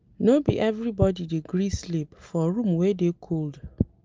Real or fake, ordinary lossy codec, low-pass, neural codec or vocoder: real; Opus, 24 kbps; 7.2 kHz; none